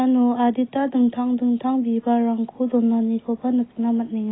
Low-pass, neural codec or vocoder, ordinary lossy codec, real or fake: 7.2 kHz; none; AAC, 16 kbps; real